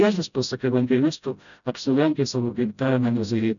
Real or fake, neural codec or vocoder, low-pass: fake; codec, 16 kHz, 0.5 kbps, FreqCodec, smaller model; 7.2 kHz